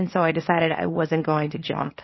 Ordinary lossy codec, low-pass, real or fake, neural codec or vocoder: MP3, 24 kbps; 7.2 kHz; fake; codec, 24 kHz, 0.9 kbps, WavTokenizer, small release